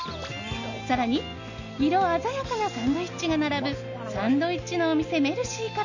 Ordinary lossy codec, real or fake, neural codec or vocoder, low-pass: none; real; none; 7.2 kHz